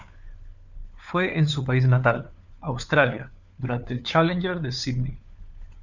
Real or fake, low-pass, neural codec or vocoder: fake; 7.2 kHz; codec, 16 kHz, 4 kbps, FunCodec, trained on LibriTTS, 50 frames a second